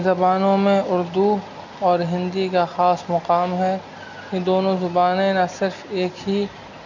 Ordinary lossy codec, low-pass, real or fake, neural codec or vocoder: none; 7.2 kHz; real; none